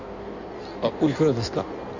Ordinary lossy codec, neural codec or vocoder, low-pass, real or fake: none; codec, 16 kHz in and 24 kHz out, 1.1 kbps, FireRedTTS-2 codec; 7.2 kHz; fake